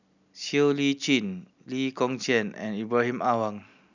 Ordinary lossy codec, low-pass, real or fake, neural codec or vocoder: none; 7.2 kHz; real; none